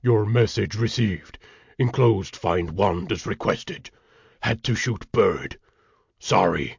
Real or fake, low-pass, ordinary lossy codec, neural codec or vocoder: real; 7.2 kHz; MP3, 64 kbps; none